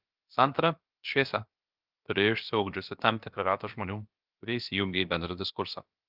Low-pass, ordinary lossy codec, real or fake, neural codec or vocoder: 5.4 kHz; Opus, 24 kbps; fake; codec, 16 kHz, about 1 kbps, DyCAST, with the encoder's durations